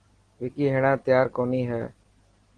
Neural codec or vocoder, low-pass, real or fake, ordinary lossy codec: none; 9.9 kHz; real; Opus, 16 kbps